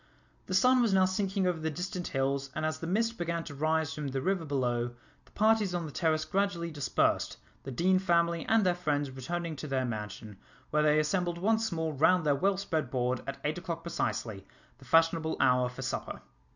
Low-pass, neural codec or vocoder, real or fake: 7.2 kHz; none; real